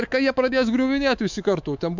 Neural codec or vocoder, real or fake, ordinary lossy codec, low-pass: codec, 24 kHz, 3.1 kbps, DualCodec; fake; MP3, 64 kbps; 7.2 kHz